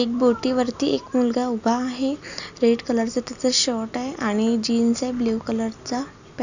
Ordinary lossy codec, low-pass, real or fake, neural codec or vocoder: none; 7.2 kHz; real; none